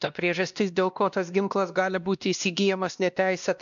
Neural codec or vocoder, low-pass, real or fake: codec, 16 kHz, 1 kbps, X-Codec, WavLM features, trained on Multilingual LibriSpeech; 7.2 kHz; fake